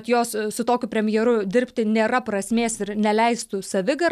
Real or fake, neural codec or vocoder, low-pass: real; none; 14.4 kHz